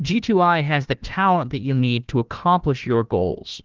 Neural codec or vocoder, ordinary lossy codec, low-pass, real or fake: codec, 16 kHz, 1 kbps, FunCodec, trained on Chinese and English, 50 frames a second; Opus, 16 kbps; 7.2 kHz; fake